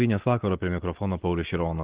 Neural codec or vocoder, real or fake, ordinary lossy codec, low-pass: none; real; Opus, 16 kbps; 3.6 kHz